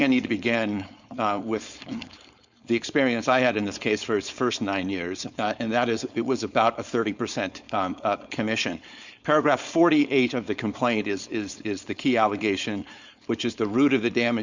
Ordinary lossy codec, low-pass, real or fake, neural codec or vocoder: Opus, 64 kbps; 7.2 kHz; fake; codec, 16 kHz, 4.8 kbps, FACodec